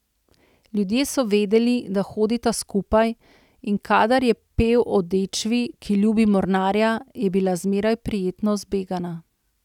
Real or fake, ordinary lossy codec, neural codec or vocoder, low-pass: real; none; none; 19.8 kHz